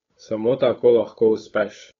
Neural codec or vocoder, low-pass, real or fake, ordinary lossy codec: codec, 16 kHz, 8 kbps, FunCodec, trained on Chinese and English, 25 frames a second; 7.2 kHz; fake; AAC, 32 kbps